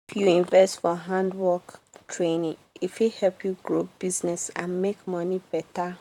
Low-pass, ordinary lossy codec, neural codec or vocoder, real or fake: 19.8 kHz; none; vocoder, 44.1 kHz, 128 mel bands, Pupu-Vocoder; fake